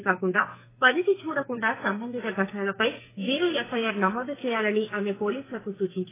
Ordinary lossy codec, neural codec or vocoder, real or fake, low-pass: AAC, 16 kbps; codec, 44.1 kHz, 2.6 kbps, SNAC; fake; 3.6 kHz